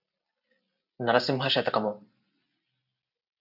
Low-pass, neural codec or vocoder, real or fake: 5.4 kHz; none; real